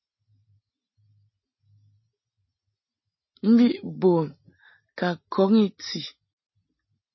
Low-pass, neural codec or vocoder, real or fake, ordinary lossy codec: 7.2 kHz; none; real; MP3, 24 kbps